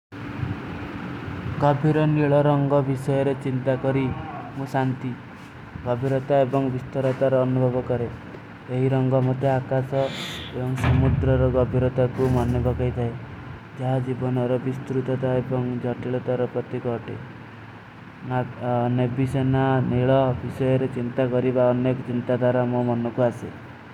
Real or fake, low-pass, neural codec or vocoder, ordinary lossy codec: real; 19.8 kHz; none; none